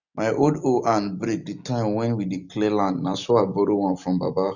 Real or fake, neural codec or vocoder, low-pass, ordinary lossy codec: real; none; 7.2 kHz; none